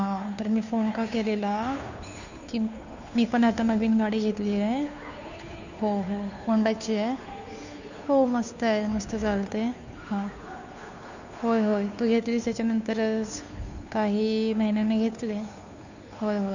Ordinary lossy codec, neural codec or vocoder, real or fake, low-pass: none; codec, 16 kHz, 2 kbps, FunCodec, trained on Chinese and English, 25 frames a second; fake; 7.2 kHz